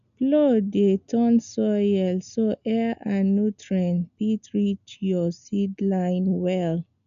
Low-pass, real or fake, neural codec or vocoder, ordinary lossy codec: 7.2 kHz; real; none; none